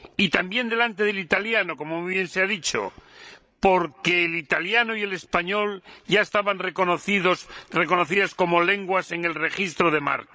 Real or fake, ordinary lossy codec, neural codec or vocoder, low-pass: fake; none; codec, 16 kHz, 16 kbps, FreqCodec, larger model; none